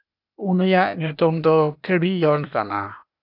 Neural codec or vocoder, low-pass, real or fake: codec, 16 kHz, 0.8 kbps, ZipCodec; 5.4 kHz; fake